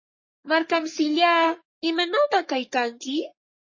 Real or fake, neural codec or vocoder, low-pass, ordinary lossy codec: fake; codec, 44.1 kHz, 3.4 kbps, Pupu-Codec; 7.2 kHz; MP3, 32 kbps